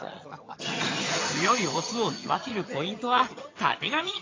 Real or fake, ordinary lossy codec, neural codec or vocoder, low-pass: fake; AAC, 32 kbps; vocoder, 22.05 kHz, 80 mel bands, HiFi-GAN; 7.2 kHz